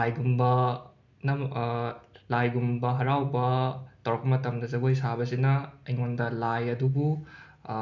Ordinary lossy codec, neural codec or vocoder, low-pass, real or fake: none; none; 7.2 kHz; real